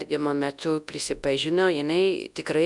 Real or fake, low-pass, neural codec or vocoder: fake; 10.8 kHz; codec, 24 kHz, 0.9 kbps, WavTokenizer, large speech release